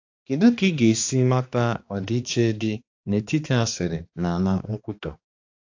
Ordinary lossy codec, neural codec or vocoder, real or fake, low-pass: AAC, 48 kbps; codec, 16 kHz, 2 kbps, X-Codec, HuBERT features, trained on balanced general audio; fake; 7.2 kHz